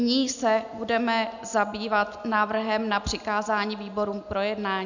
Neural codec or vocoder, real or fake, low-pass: none; real; 7.2 kHz